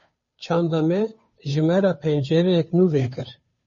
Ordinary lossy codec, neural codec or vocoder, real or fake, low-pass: MP3, 32 kbps; codec, 16 kHz, 2 kbps, FunCodec, trained on Chinese and English, 25 frames a second; fake; 7.2 kHz